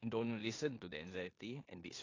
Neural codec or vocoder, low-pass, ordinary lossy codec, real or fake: codec, 16 kHz in and 24 kHz out, 0.9 kbps, LongCat-Audio-Codec, four codebook decoder; 7.2 kHz; AAC, 32 kbps; fake